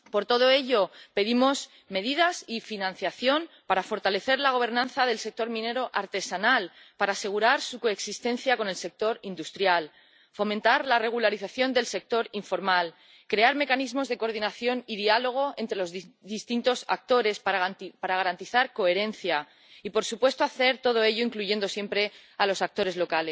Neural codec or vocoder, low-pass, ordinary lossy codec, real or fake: none; none; none; real